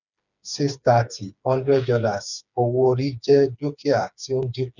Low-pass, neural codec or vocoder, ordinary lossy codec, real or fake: 7.2 kHz; codec, 16 kHz, 4 kbps, FreqCodec, smaller model; none; fake